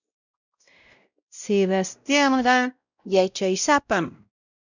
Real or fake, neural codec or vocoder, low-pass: fake; codec, 16 kHz, 0.5 kbps, X-Codec, WavLM features, trained on Multilingual LibriSpeech; 7.2 kHz